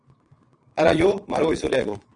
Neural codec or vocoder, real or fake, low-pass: vocoder, 22.05 kHz, 80 mel bands, Vocos; fake; 9.9 kHz